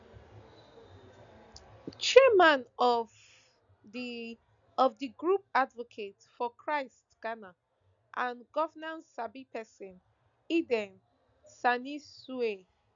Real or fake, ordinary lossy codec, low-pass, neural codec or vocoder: real; none; 7.2 kHz; none